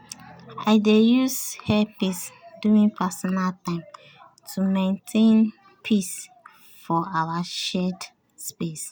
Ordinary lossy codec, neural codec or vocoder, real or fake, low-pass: none; none; real; none